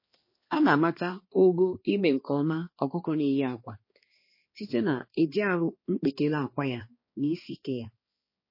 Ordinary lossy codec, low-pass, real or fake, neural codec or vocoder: MP3, 24 kbps; 5.4 kHz; fake; codec, 16 kHz, 2 kbps, X-Codec, HuBERT features, trained on balanced general audio